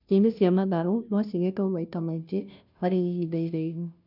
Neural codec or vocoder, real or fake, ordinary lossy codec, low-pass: codec, 16 kHz, 0.5 kbps, FunCodec, trained on Chinese and English, 25 frames a second; fake; none; 5.4 kHz